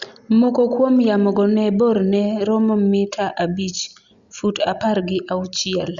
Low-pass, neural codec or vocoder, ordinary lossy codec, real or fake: 7.2 kHz; none; Opus, 64 kbps; real